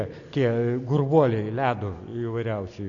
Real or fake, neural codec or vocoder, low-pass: real; none; 7.2 kHz